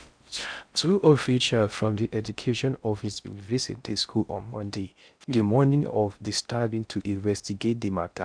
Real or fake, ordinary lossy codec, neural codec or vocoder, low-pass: fake; none; codec, 16 kHz in and 24 kHz out, 0.6 kbps, FocalCodec, streaming, 4096 codes; 9.9 kHz